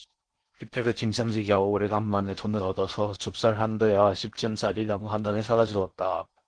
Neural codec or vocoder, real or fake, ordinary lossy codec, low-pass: codec, 16 kHz in and 24 kHz out, 0.6 kbps, FocalCodec, streaming, 4096 codes; fake; Opus, 16 kbps; 9.9 kHz